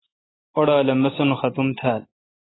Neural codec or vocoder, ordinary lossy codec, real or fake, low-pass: none; AAC, 16 kbps; real; 7.2 kHz